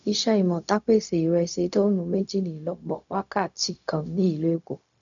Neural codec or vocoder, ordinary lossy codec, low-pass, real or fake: codec, 16 kHz, 0.4 kbps, LongCat-Audio-Codec; none; 7.2 kHz; fake